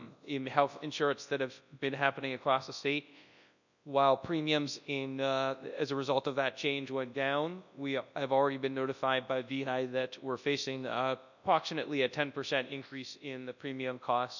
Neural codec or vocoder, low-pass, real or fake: codec, 24 kHz, 0.9 kbps, WavTokenizer, large speech release; 7.2 kHz; fake